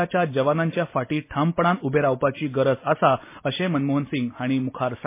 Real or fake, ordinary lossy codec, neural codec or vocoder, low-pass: real; MP3, 24 kbps; none; 3.6 kHz